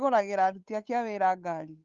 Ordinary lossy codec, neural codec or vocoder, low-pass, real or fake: Opus, 32 kbps; codec, 16 kHz, 8 kbps, FunCodec, trained on Chinese and English, 25 frames a second; 7.2 kHz; fake